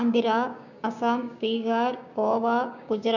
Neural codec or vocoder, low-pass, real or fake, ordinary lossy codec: codec, 16 kHz, 6 kbps, DAC; 7.2 kHz; fake; none